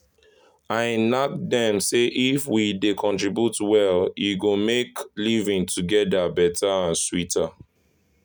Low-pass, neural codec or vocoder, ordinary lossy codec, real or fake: none; none; none; real